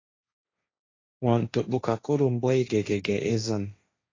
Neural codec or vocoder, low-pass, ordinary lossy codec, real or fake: codec, 16 kHz, 1.1 kbps, Voila-Tokenizer; 7.2 kHz; AAC, 32 kbps; fake